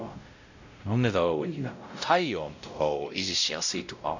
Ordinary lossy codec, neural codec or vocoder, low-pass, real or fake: none; codec, 16 kHz, 0.5 kbps, X-Codec, WavLM features, trained on Multilingual LibriSpeech; 7.2 kHz; fake